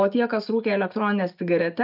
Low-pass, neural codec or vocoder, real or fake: 5.4 kHz; none; real